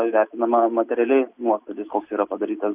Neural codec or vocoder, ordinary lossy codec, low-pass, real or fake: none; Opus, 64 kbps; 3.6 kHz; real